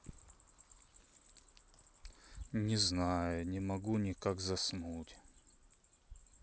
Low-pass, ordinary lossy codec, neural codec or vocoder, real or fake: none; none; none; real